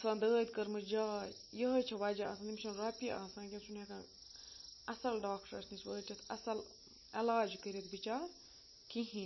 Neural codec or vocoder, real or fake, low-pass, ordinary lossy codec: none; real; 7.2 kHz; MP3, 24 kbps